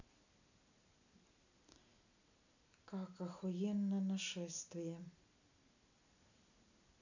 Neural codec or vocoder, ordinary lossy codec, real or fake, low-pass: none; none; real; 7.2 kHz